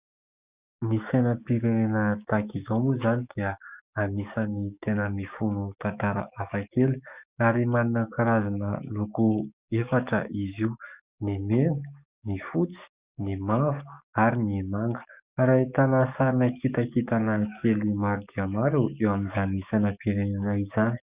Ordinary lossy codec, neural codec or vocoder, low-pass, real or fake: Opus, 64 kbps; codec, 44.1 kHz, 7.8 kbps, DAC; 3.6 kHz; fake